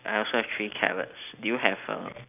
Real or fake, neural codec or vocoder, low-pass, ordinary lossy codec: real; none; 3.6 kHz; none